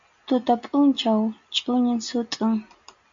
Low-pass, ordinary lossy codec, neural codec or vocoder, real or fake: 7.2 kHz; MP3, 48 kbps; none; real